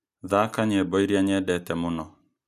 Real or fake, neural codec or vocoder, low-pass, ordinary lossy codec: real; none; 14.4 kHz; none